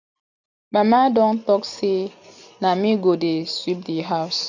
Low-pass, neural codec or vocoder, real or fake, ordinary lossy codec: 7.2 kHz; none; real; none